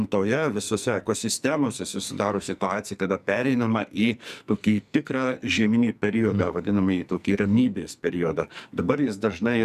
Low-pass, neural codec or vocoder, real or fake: 14.4 kHz; codec, 44.1 kHz, 2.6 kbps, SNAC; fake